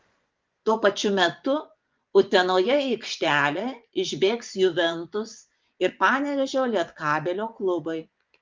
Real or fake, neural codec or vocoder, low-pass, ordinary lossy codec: fake; vocoder, 24 kHz, 100 mel bands, Vocos; 7.2 kHz; Opus, 32 kbps